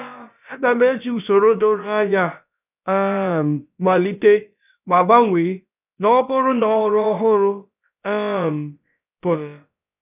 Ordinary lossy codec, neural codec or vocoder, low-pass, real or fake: none; codec, 16 kHz, about 1 kbps, DyCAST, with the encoder's durations; 3.6 kHz; fake